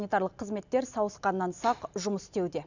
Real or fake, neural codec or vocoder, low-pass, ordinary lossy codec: real; none; 7.2 kHz; none